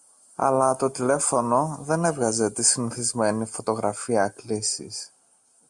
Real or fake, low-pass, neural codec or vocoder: real; 10.8 kHz; none